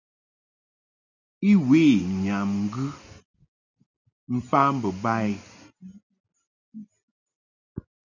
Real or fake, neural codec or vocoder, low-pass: real; none; 7.2 kHz